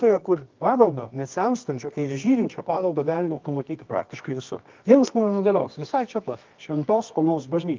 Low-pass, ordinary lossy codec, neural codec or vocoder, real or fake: 7.2 kHz; Opus, 32 kbps; codec, 24 kHz, 0.9 kbps, WavTokenizer, medium music audio release; fake